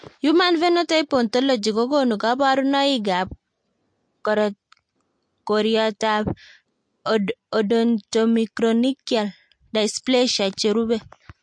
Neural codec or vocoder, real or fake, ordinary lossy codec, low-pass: none; real; MP3, 48 kbps; 9.9 kHz